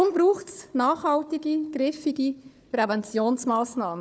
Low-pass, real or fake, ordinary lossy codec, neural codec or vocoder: none; fake; none; codec, 16 kHz, 4 kbps, FunCodec, trained on Chinese and English, 50 frames a second